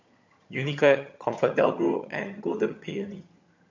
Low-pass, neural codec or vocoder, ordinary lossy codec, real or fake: 7.2 kHz; vocoder, 22.05 kHz, 80 mel bands, HiFi-GAN; MP3, 48 kbps; fake